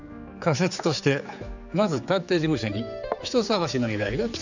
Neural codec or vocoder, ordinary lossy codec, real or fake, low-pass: codec, 16 kHz, 4 kbps, X-Codec, HuBERT features, trained on general audio; none; fake; 7.2 kHz